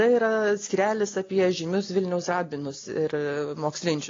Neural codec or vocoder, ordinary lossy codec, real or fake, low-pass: none; AAC, 32 kbps; real; 7.2 kHz